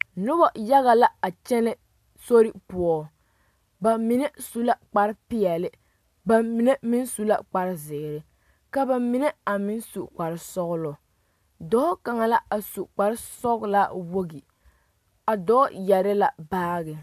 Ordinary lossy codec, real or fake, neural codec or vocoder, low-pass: MP3, 96 kbps; real; none; 14.4 kHz